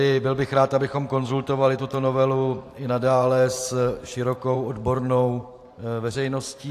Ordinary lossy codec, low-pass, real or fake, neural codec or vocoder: AAC, 64 kbps; 14.4 kHz; fake; vocoder, 44.1 kHz, 128 mel bands every 512 samples, BigVGAN v2